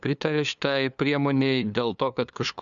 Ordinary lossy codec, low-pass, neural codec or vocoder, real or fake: MP3, 96 kbps; 7.2 kHz; codec, 16 kHz, 2 kbps, FunCodec, trained on LibriTTS, 25 frames a second; fake